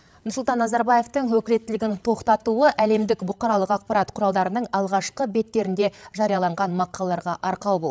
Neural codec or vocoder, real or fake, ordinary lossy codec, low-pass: codec, 16 kHz, 8 kbps, FreqCodec, larger model; fake; none; none